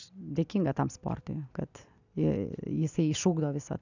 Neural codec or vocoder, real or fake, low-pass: none; real; 7.2 kHz